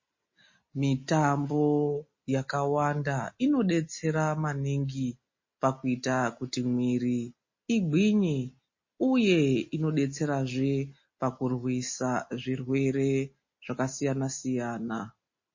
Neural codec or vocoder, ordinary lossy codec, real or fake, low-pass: none; MP3, 32 kbps; real; 7.2 kHz